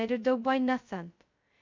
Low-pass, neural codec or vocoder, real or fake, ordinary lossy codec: 7.2 kHz; codec, 16 kHz, 0.2 kbps, FocalCodec; fake; MP3, 64 kbps